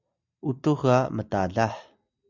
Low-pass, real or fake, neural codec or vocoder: 7.2 kHz; real; none